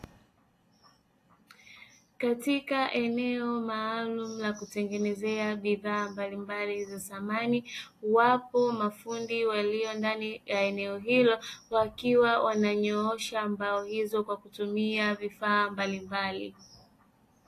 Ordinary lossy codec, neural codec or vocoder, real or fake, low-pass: AAC, 48 kbps; none; real; 14.4 kHz